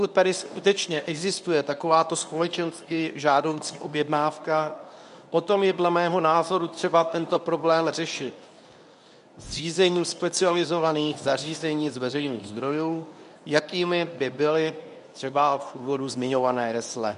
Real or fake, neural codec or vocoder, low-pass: fake; codec, 24 kHz, 0.9 kbps, WavTokenizer, medium speech release version 1; 10.8 kHz